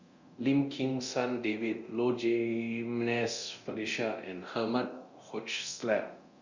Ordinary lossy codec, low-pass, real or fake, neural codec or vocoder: Opus, 64 kbps; 7.2 kHz; fake; codec, 24 kHz, 0.9 kbps, DualCodec